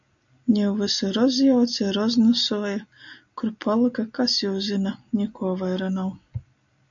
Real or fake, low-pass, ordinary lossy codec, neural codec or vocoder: real; 7.2 kHz; AAC, 64 kbps; none